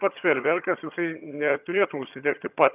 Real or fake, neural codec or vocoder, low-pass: fake; vocoder, 22.05 kHz, 80 mel bands, HiFi-GAN; 3.6 kHz